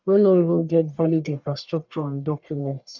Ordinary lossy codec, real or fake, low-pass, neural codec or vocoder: none; fake; 7.2 kHz; codec, 44.1 kHz, 1.7 kbps, Pupu-Codec